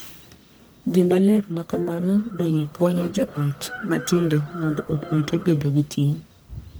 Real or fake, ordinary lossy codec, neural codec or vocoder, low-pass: fake; none; codec, 44.1 kHz, 1.7 kbps, Pupu-Codec; none